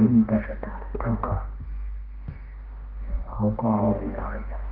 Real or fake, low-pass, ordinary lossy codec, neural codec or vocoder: fake; 5.4 kHz; Opus, 32 kbps; codec, 16 kHz, 1 kbps, X-Codec, HuBERT features, trained on balanced general audio